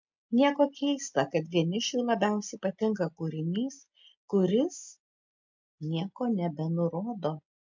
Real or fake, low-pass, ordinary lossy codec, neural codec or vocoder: real; 7.2 kHz; MP3, 64 kbps; none